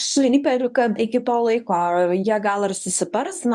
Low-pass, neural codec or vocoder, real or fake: 10.8 kHz; codec, 24 kHz, 0.9 kbps, WavTokenizer, medium speech release version 1; fake